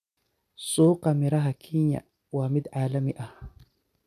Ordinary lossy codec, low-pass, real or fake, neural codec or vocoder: none; 14.4 kHz; real; none